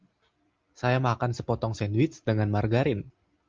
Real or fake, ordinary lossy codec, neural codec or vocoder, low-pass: real; Opus, 24 kbps; none; 7.2 kHz